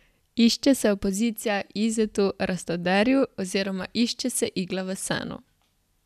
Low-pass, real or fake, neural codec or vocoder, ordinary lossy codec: 14.4 kHz; real; none; none